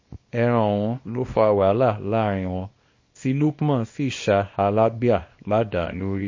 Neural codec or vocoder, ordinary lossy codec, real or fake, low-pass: codec, 24 kHz, 0.9 kbps, WavTokenizer, small release; MP3, 32 kbps; fake; 7.2 kHz